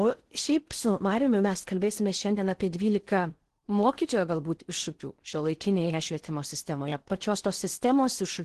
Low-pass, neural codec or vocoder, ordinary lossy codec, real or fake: 10.8 kHz; codec, 16 kHz in and 24 kHz out, 0.6 kbps, FocalCodec, streaming, 4096 codes; Opus, 16 kbps; fake